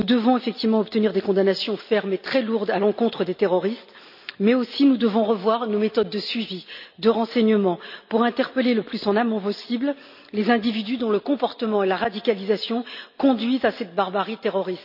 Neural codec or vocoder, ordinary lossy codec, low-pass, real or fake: none; none; 5.4 kHz; real